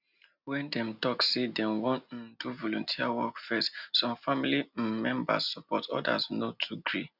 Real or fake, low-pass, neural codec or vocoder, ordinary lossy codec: real; 5.4 kHz; none; none